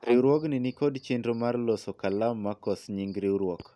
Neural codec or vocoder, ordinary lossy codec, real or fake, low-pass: none; none; real; none